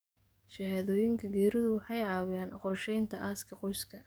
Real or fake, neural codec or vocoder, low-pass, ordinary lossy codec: fake; codec, 44.1 kHz, 7.8 kbps, DAC; none; none